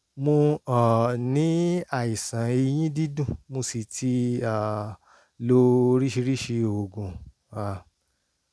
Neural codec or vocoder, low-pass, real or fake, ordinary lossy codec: none; none; real; none